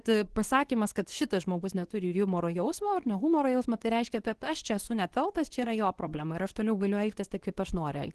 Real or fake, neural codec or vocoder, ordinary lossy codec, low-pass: fake; codec, 24 kHz, 0.9 kbps, WavTokenizer, medium speech release version 2; Opus, 16 kbps; 10.8 kHz